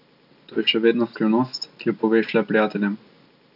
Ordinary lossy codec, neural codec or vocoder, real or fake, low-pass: none; none; real; 5.4 kHz